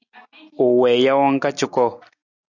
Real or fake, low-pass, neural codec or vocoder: real; 7.2 kHz; none